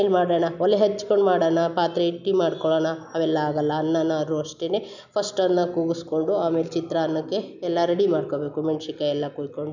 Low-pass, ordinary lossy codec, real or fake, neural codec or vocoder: 7.2 kHz; none; real; none